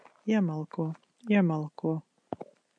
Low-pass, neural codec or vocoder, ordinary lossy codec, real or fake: 9.9 kHz; none; MP3, 64 kbps; real